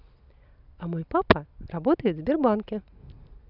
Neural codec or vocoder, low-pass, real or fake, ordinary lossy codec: none; 5.4 kHz; real; none